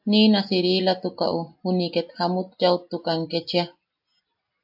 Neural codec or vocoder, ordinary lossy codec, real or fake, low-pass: none; AAC, 48 kbps; real; 5.4 kHz